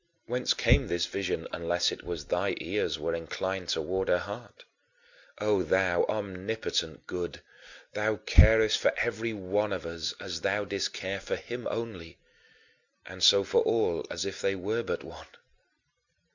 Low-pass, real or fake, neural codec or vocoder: 7.2 kHz; real; none